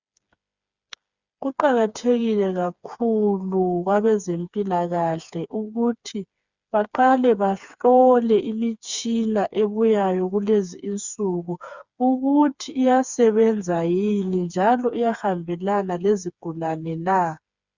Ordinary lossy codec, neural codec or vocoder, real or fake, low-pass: Opus, 64 kbps; codec, 16 kHz, 4 kbps, FreqCodec, smaller model; fake; 7.2 kHz